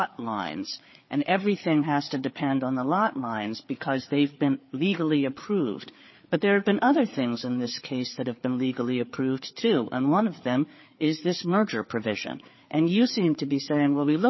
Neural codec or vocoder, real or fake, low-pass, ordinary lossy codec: codec, 16 kHz, 4 kbps, FreqCodec, larger model; fake; 7.2 kHz; MP3, 24 kbps